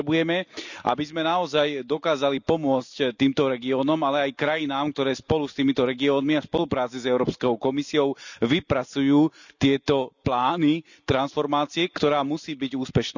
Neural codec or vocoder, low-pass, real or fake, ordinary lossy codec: none; 7.2 kHz; real; none